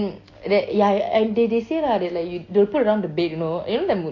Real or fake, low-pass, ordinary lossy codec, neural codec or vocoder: real; 7.2 kHz; AAC, 32 kbps; none